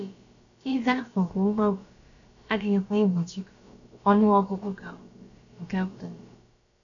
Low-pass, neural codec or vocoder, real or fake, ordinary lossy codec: 7.2 kHz; codec, 16 kHz, about 1 kbps, DyCAST, with the encoder's durations; fake; none